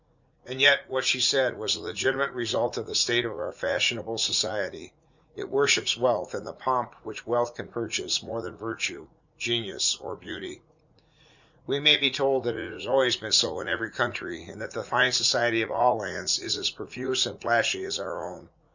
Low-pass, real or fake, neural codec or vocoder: 7.2 kHz; fake; vocoder, 44.1 kHz, 80 mel bands, Vocos